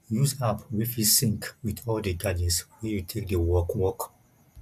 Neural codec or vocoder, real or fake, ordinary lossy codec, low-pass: none; real; AAC, 96 kbps; 14.4 kHz